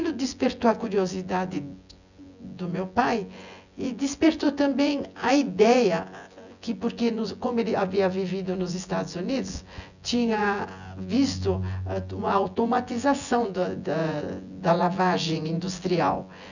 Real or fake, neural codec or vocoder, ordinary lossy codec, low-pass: fake; vocoder, 24 kHz, 100 mel bands, Vocos; none; 7.2 kHz